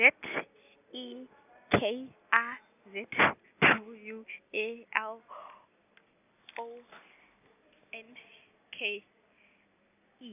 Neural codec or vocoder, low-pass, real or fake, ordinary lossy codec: none; 3.6 kHz; real; none